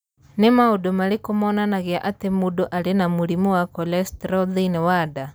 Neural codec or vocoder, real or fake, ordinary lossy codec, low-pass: none; real; none; none